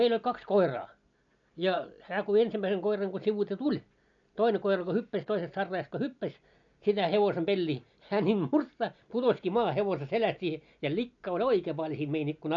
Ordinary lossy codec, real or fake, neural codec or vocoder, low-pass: none; real; none; 7.2 kHz